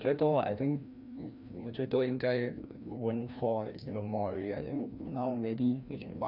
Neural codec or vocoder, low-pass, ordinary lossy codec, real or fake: codec, 16 kHz, 1 kbps, FreqCodec, larger model; 5.4 kHz; none; fake